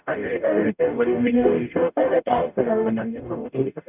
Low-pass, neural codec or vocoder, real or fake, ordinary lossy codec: 3.6 kHz; codec, 44.1 kHz, 0.9 kbps, DAC; fake; none